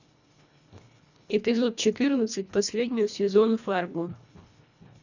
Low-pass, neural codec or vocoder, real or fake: 7.2 kHz; codec, 24 kHz, 1.5 kbps, HILCodec; fake